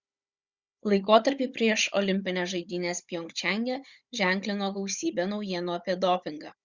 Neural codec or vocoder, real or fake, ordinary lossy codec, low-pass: codec, 16 kHz, 16 kbps, FunCodec, trained on Chinese and English, 50 frames a second; fake; Opus, 64 kbps; 7.2 kHz